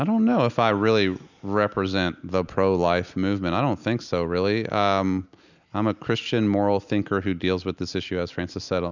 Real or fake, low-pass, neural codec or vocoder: real; 7.2 kHz; none